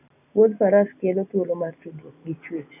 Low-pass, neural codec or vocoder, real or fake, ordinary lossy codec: 3.6 kHz; none; real; none